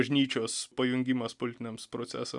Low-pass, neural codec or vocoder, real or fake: 10.8 kHz; none; real